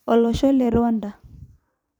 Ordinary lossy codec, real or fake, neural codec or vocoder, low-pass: none; fake; vocoder, 44.1 kHz, 128 mel bands every 256 samples, BigVGAN v2; 19.8 kHz